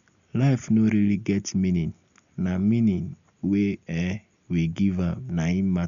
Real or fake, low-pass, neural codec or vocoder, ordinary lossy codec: real; 7.2 kHz; none; none